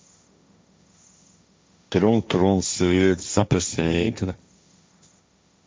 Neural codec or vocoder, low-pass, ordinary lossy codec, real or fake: codec, 16 kHz, 1.1 kbps, Voila-Tokenizer; none; none; fake